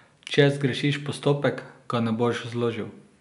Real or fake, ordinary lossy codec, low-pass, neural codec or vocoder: real; none; 10.8 kHz; none